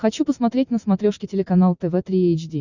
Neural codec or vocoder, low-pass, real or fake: none; 7.2 kHz; real